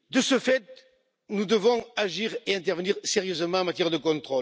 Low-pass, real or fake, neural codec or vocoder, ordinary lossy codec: none; real; none; none